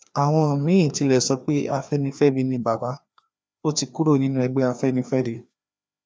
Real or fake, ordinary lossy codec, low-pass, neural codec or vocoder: fake; none; none; codec, 16 kHz, 2 kbps, FreqCodec, larger model